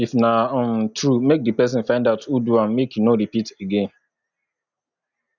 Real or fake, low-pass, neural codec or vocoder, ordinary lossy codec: real; 7.2 kHz; none; none